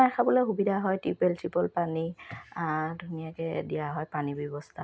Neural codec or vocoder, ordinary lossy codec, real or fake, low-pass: none; none; real; none